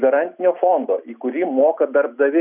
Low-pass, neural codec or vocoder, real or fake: 3.6 kHz; none; real